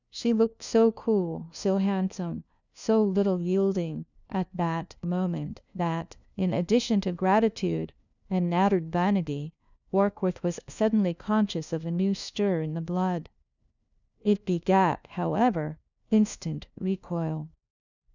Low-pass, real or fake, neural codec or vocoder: 7.2 kHz; fake; codec, 16 kHz, 1 kbps, FunCodec, trained on LibriTTS, 50 frames a second